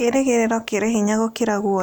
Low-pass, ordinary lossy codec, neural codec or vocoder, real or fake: none; none; none; real